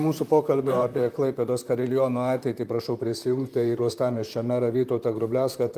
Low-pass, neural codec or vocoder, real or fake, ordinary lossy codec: 14.4 kHz; vocoder, 44.1 kHz, 128 mel bands, Pupu-Vocoder; fake; Opus, 32 kbps